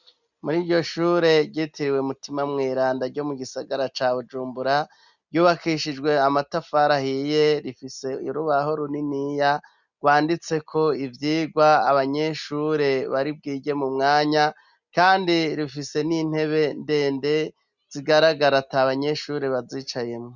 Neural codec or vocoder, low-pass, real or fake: none; 7.2 kHz; real